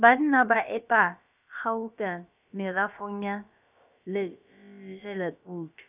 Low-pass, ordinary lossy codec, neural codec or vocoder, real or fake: 3.6 kHz; none; codec, 16 kHz, about 1 kbps, DyCAST, with the encoder's durations; fake